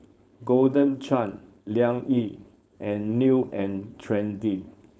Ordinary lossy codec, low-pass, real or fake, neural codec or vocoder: none; none; fake; codec, 16 kHz, 4.8 kbps, FACodec